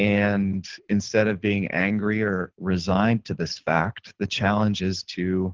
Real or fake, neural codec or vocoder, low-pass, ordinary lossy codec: fake; codec, 24 kHz, 6 kbps, HILCodec; 7.2 kHz; Opus, 16 kbps